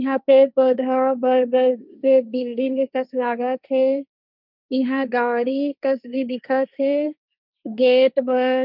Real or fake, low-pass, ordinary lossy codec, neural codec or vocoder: fake; 5.4 kHz; none; codec, 16 kHz, 1.1 kbps, Voila-Tokenizer